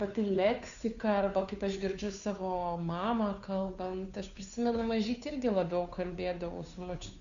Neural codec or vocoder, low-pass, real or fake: codec, 16 kHz, 2 kbps, FunCodec, trained on Chinese and English, 25 frames a second; 7.2 kHz; fake